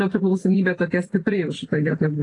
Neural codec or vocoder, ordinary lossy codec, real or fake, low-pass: vocoder, 44.1 kHz, 128 mel bands every 256 samples, BigVGAN v2; AAC, 32 kbps; fake; 10.8 kHz